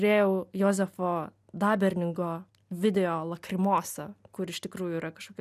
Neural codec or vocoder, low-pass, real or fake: none; 14.4 kHz; real